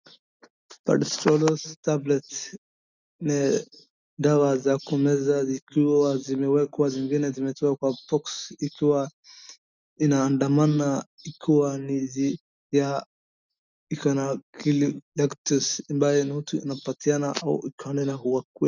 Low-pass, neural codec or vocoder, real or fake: 7.2 kHz; none; real